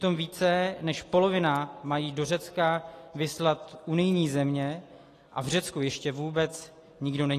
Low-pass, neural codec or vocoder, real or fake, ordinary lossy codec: 14.4 kHz; none; real; AAC, 48 kbps